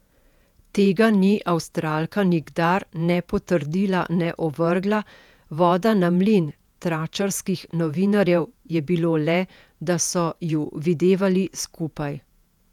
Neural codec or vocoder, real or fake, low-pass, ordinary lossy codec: vocoder, 48 kHz, 128 mel bands, Vocos; fake; 19.8 kHz; none